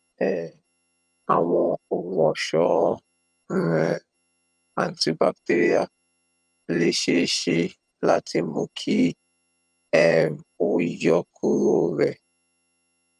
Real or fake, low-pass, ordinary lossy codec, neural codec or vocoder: fake; none; none; vocoder, 22.05 kHz, 80 mel bands, HiFi-GAN